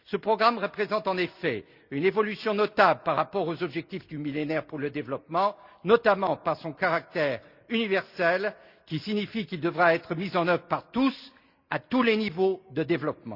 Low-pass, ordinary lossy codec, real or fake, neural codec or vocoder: 5.4 kHz; Opus, 64 kbps; real; none